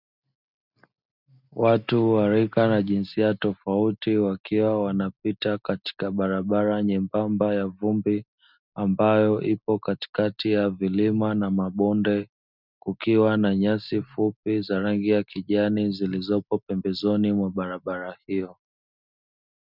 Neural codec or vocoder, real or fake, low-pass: none; real; 5.4 kHz